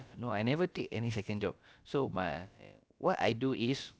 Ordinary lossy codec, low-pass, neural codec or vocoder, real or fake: none; none; codec, 16 kHz, about 1 kbps, DyCAST, with the encoder's durations; fake